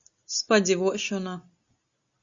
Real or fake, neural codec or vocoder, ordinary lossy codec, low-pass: real; none; Opus, 64 kbps; 7.2 kHz